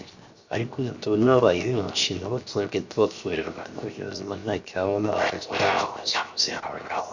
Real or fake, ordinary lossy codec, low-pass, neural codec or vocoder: fake; Opus, 64 kbps; 7.2 kHz; codec, 16 kHz, 0.7 kbps, FocalCodec